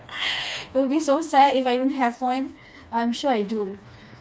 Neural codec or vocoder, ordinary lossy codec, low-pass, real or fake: codec, 16 kHz, 2 kbps, FreqCodec, smaller model; none; none; fake